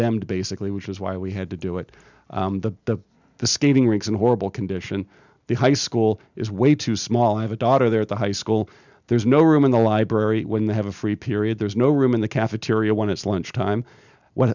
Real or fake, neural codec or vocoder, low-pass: real; none; 7.2 kHz